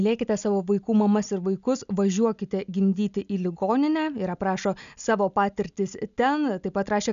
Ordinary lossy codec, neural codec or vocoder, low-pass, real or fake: MP3, 96 kbps; none; 7.2 kHz; real